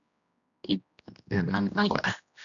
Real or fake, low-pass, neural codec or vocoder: fake; 7.2 kHz; codec, 16 kHz, 1 kbps, X-Codec, HuBERT features, trained on general audio